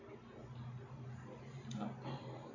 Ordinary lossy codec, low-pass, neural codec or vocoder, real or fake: none; 7.2 kHz; none; real